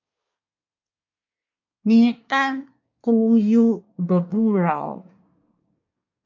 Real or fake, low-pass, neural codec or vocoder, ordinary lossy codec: fake; 7.2 kHz; codec, 24 kHz, 1 kbps, SNAC; MP3, 48 kbps